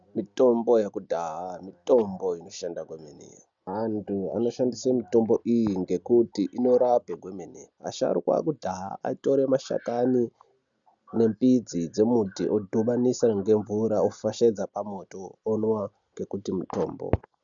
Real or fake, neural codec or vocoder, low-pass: real; none; 7.2 kHz